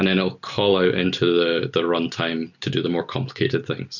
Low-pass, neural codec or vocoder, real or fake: 7.2 kHz; none; real